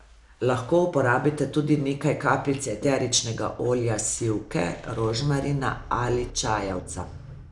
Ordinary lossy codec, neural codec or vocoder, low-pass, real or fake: none; none; 10.8 kHz; real